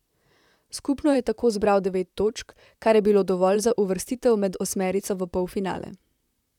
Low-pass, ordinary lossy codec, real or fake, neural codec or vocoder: 19.8 kHz; none; fake; vocoder, 44.1 kHz, 128 mel bands, Pupu-Vocoder